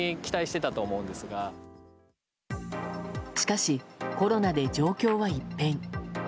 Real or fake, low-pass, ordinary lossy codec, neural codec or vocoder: real; none; none; none